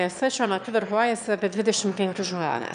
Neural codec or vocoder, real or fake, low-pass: autoencoder, 22.05 kHz, a latent of 192 numbers a frame, VITS, trained on one speaker; fake; 9.9 kHz